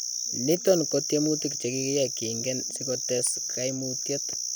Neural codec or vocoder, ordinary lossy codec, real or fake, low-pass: none; none; real; none